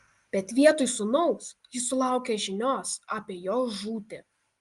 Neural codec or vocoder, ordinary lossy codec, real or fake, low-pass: none; Opus, 32 kbps; real; 10.8 kHz